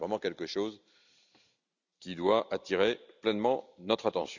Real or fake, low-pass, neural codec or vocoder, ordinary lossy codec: real; 7.2 kHz; none; none